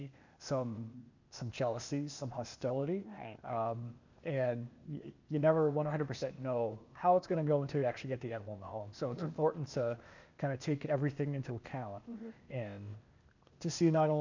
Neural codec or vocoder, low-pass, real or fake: codec, 16 kHz, 0.8 kbps, ZipCodec; 7.2 kHz; fake